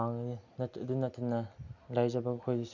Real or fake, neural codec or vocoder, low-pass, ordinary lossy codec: real; none; 7.2 kHz; none